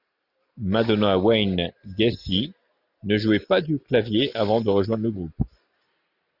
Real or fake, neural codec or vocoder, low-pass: real; none; 5.4 kHz